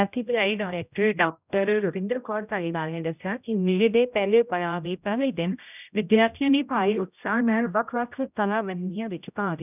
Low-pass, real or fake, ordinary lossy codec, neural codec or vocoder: 3.6 kHz; fake; none; codec, 16 kHz, 0.5 kbps, X-Codec, HuBERT features, trained on general audio